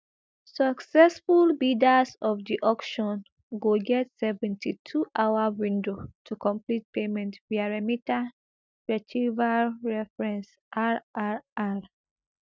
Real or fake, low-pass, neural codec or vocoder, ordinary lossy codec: real; none; none; none